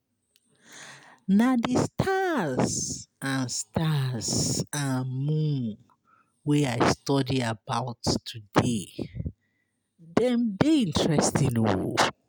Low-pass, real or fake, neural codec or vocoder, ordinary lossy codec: none; real; none; none